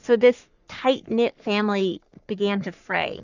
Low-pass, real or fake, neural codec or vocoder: 7.2 kHz; fake; codec, 44.1 kHz, 3.4 kbps, Pupu-Codec